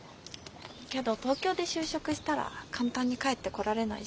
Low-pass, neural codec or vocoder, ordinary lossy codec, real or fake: none; none; none; real